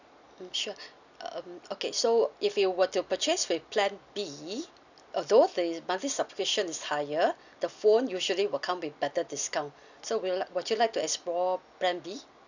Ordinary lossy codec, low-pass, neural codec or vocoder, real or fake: none; 7.2 kHz; none; real